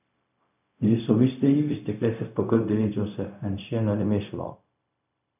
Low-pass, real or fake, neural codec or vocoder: 3.6 kHz; fake; codec, 16 kHz, 0.4 kbps, LongCat-Audio-Codec